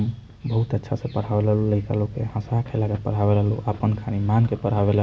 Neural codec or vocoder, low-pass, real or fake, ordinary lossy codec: none; none; real; none